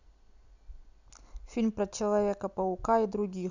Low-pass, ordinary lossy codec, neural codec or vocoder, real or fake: 7.2 kHz; none; none; real